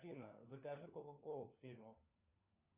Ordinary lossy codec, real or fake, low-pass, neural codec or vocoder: Opus, 64 kbps; fake; 3.6 kHz; codec, 16 kHz, 4 kbps, FunCodec, trained on LibriTTS, 50 frames a second